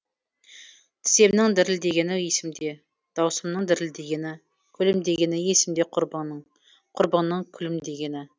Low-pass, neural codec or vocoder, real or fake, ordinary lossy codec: none; none; real; none